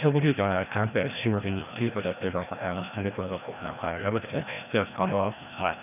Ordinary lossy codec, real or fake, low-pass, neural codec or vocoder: none; fake; 3.6 kHz; codec, 16 kHz, 1 kbps, FreqCodec, larger model